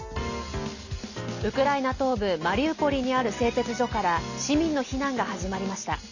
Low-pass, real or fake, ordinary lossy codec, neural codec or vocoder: 7.2 kHz; real; none; none